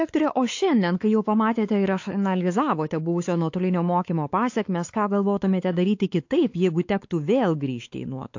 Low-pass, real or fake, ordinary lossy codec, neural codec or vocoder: 7.2 kHz; fake; AAC, 48 kbps; codec, 16 kHz, 4 kbps, X-Codec, WavLM features, trained on Multilingual LibriSpeech